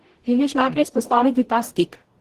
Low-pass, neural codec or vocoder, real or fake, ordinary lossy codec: 14.4 kHz; codec, 44.1 kHz, 0.9 kbps, DAC; fake; Opus, 16 kbps